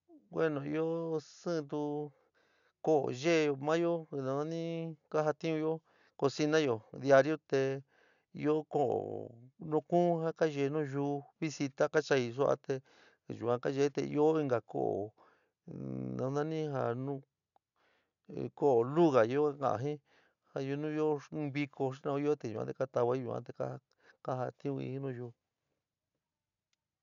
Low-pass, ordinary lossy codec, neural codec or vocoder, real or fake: 7.2 kHz; none; none; real